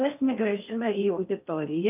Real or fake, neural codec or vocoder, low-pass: fake; codec, 16 kHz in and 24 kHz out, 0.6 kbps, FocalCodec, streaming, 4096 codes; 3.6 kHz